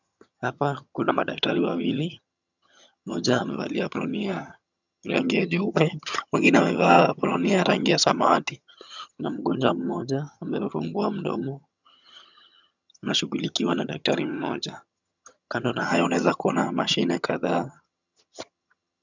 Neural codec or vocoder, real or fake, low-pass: vocoder, 22.05 kHz, 80 mel bands, HiFi-GAN; fake; 7.2 kHz